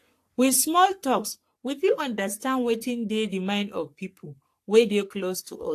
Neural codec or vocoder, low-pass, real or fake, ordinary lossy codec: codec, 44.1 kHz, 3.4 kbps, Pupu-Codec; 14.4 kHz; fake; AAC, 64 kbps